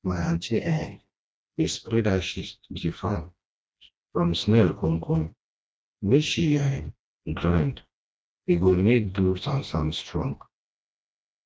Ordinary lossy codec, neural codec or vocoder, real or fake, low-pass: none; codec, 16 kHz, 1 kbps, FreqCodec, smaller model; fake; none